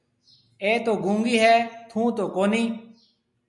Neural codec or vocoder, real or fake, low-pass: none; real; 10.8 kHz